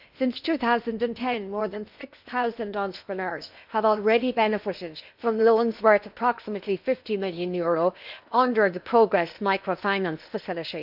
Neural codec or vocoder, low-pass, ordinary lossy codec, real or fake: codec, 16 kHz in and 24 kHz out, 0.8 kbps, FocalCodec, streaming, 65536 codes; 5.4 kHz; none; fake